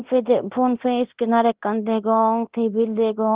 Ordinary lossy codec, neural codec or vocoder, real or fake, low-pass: Opus, 16 kbps; codec, 24 kHz, 0.9 kbps, DualCodec; fake; 3.6 kHz